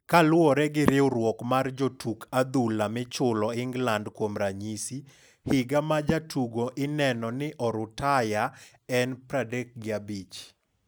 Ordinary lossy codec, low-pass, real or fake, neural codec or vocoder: none; none; real; none